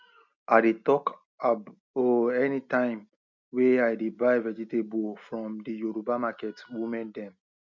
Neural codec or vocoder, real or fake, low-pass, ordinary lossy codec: none; real; 7.2 kHz; none